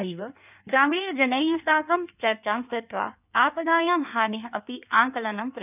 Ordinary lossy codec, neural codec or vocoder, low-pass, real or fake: none; codec, 16 kHz in and 24 kHz out, 1.1 kbps, FireRedTTS-2 codec; 3.6 kHz; fake